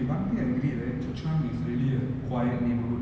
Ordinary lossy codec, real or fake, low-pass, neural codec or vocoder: none; real; none; none